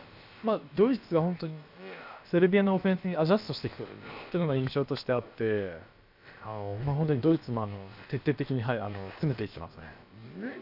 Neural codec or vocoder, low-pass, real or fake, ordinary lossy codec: codec, 16 kHz, about 1 kbps, DyCAST, with the encoder's durations; 5.4 kHz; fake; none